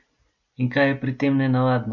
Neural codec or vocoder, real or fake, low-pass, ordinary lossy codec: none; real; 7.2 kHz; none